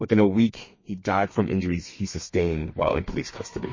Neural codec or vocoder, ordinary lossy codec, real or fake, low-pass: codec, 32 kHz, 1.9 kbps, SNAC; MP3, 32 kbps; fake; 7.2 kHz